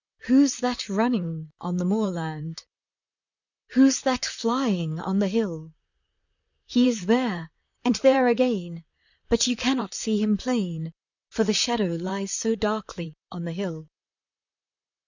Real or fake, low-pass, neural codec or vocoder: fake; 7.2 kHz; vocoder, 44.1 kHz, 128 mel bands, Pupu-Vocoder